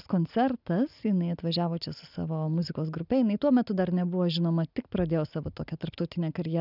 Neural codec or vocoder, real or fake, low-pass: none; real; 5.4 kHz